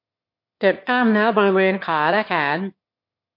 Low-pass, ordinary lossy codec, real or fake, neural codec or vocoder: 5.4 kHz; MP3, 32 kbps; fake; autoencoder, 22.05 kHz, a latent of 192 numbers a frame, VITS, trained on one speaker